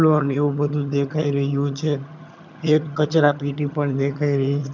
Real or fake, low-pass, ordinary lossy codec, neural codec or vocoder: fake; 7.2 kHz; none; vocoder, 22.05 kHz, 80 mel bands, HiFi-GAN